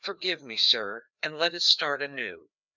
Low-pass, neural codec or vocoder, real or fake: 7.2 kHz; codec, 16 kHz, 2 kbps, FreqCodec, larger model; fake